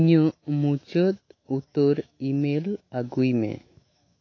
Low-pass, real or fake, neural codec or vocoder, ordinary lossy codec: 7.2 kHz; fake; autoencoder, 48 kHz, 128 numbers a frame, DAC-VAE, trained on Japanese speech; AAC, 32 kbps